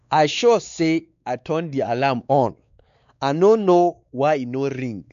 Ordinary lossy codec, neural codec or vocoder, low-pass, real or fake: none; codec, 16 kHz, 4 kbps, X-Codec, WavLM features, trained on Multilingual LibriSpeech; 7.2 kHz; fake